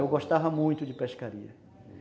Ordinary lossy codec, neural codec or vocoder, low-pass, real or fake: none; none; none; real